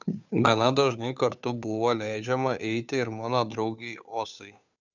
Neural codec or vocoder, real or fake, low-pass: codec, 16 kHz, 2 kbps, FunCodec, trained on Chinese and English, 25 frames a second; fake; 7.2 kHz